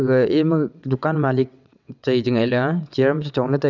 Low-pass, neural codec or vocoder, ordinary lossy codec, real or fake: 7.2 kHz; vocoder, 22.05 kHz, 80 mel bands, WaveNeXt; none; fake